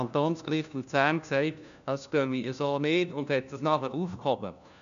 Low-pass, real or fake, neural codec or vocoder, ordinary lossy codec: 7.2 kHz; fake; codec, 16 kHz, 1 kbps, FunCodec, trained on LibriTTS, 50 frames a second; none